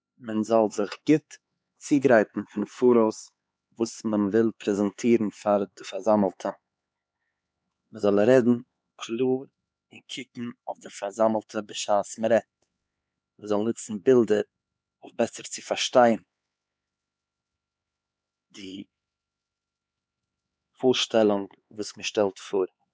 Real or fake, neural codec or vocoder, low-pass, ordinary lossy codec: fake; codec, 16 kHz, 4 kbps, X-Codec, HuBERT features, trained on LibriSpeech; none; none